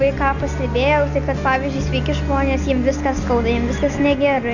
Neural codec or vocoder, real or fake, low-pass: none; real; 7.2 kHz